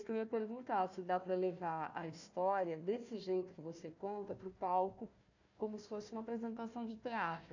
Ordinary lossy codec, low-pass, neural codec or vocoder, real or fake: none; 7.2 kHz; codec, 16 kHz, 1 kbps, FunCodec, trained on Chinese and English, 50 frames a second; fake